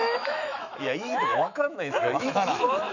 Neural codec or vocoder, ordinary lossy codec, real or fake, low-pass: autoencoder, 48 kHz, 128 numbers a frame, DAC-VAE, trained on Japanese speech; none; fake; 7.2 kHz